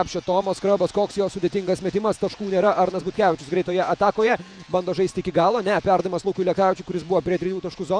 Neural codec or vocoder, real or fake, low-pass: vocoder, 48 kHz, 128 mel bands, Vocos; fake; 9.9 kHz